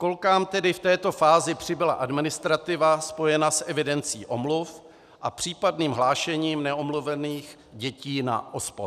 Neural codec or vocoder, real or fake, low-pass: none; real; 14.4 kHz